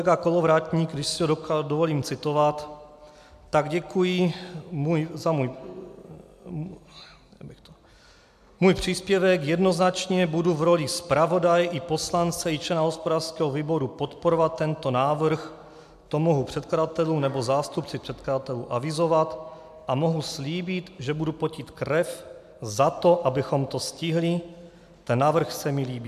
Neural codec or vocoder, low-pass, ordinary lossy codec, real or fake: none; 14.4 kHz; MP3, 96 kbps; real